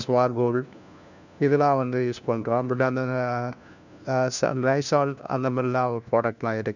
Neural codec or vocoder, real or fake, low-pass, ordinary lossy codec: codec, 16 kHz, 1 kbps, FunCodec, trained on LibriTTS, 50 frames a second; fake; 7.2 kHz; none